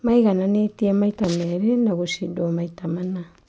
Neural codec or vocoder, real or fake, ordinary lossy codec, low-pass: none; real; none; none